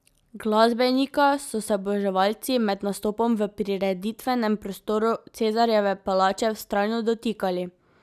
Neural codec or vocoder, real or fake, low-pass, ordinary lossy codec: none; real; 14.4 kHz; none